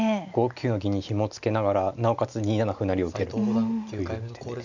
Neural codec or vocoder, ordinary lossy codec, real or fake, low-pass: none; none; real; 7.2 kHz